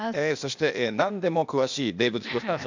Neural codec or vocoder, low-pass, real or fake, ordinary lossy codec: codec, 16 kHz, 0.8 kbps, ZipCodec; 7.2 kHz; fake; MP3, 64 kbps